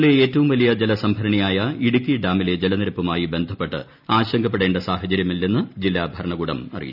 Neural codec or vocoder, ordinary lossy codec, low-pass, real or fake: none; none; 5.4 kHz; real